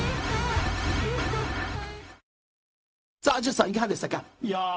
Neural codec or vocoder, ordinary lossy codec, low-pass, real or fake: codec, 16 kHz, 0.4 kbps, LongCat-Audio-Codec; none; none; fake